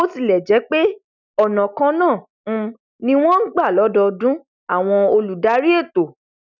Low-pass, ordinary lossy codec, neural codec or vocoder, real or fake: 7.2 kHz; none; none; real